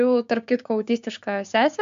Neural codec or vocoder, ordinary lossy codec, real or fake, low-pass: codec, 16 kHz, 6 kbps, DAC; MP3, 64 kbps; fake; 7.2 kHz